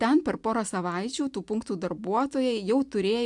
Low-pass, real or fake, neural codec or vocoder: 10.8 kHz; real; none